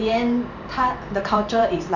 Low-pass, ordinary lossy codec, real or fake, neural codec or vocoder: 7.2 kHz; none; fake; vocoder, 44.1 kHz, 128 mel bands every 512 samples, BigVGAN v2